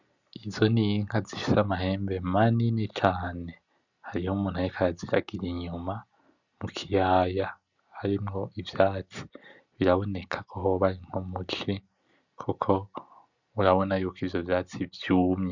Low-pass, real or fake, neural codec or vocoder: 7.2 kHz; real; none